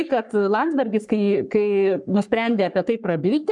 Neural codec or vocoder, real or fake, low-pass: codec, 44.1 kHz, 3.4 kbps, Pupu-Codec; fake; 10.8 kHz